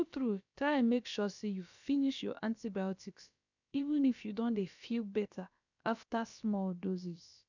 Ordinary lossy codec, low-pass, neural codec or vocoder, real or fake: none; 7.2 kHz; codec, 16 kHz, about 1 kbps, DyCAST, with the encoder's durations; fake